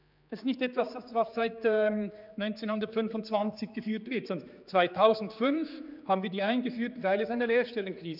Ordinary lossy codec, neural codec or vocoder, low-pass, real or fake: none; codec, 16 kHz, 4 kbps, X-Codec, HuBERT features, trained on general audio; 5.4 kHz; fake